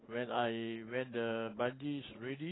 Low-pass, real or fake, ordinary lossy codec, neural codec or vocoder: 7.2 kHz; real; AAC, 16 kbps; none